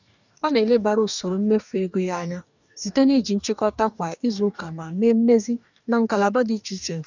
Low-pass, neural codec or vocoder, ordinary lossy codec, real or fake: 7.2 kHz; codec, 44.1 kHz, 2.6 kbps, DAC; none; fake